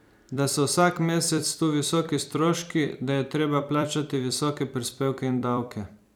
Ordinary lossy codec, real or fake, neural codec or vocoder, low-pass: none; fake; vocoder, 44.1 kHz, 128 mel bands every 256 samples, BigVGAN v2; none